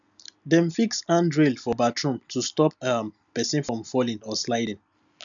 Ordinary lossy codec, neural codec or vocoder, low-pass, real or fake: none; none; 7.2 kHz; real